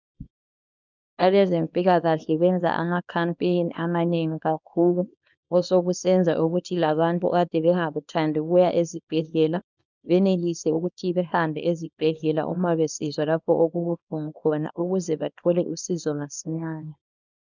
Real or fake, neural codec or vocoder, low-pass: fake; codec, 24 kHz, 0.9 kbps, WavTokenizer, small release; 7.2 kHz